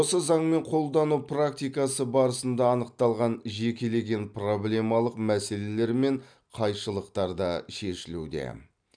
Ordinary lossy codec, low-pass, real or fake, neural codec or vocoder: none; 9.9 kHz; real; none